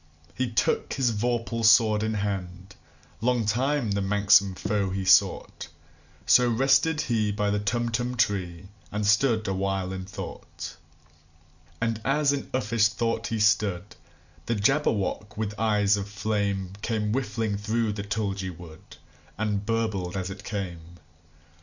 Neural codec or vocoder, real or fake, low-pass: none; real; 7.2 kHz